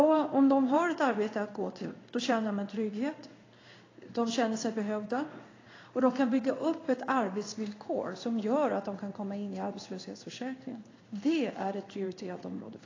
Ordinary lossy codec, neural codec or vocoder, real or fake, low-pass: AAC, 32 kbps; codec, 16 kHz in and 24 kHz out, 1 kbps, XY-Tokenizer; fake; 7.2 kHz